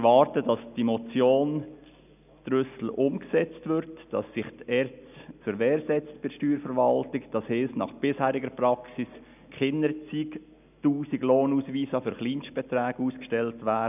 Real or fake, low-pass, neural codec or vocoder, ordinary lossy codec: real; 3.6 kHz; none; none